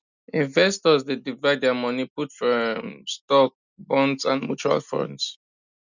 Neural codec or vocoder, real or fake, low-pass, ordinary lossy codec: none; real; 7.2 kHz; none